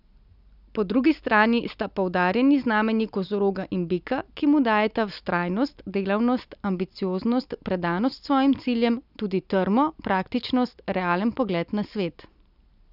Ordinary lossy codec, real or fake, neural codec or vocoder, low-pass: none; real; none; 5.4 kHz